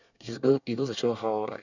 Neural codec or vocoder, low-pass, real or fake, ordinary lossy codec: codec, 24 kHz, 1 kbps, SNAC; 7.2 kHz; fake; none